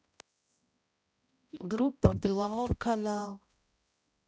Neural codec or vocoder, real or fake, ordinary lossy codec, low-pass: codec, 16 kHz, 0.5 kbps, X-Codec, HuBERT features, trained on balanced general audio; fake; none; none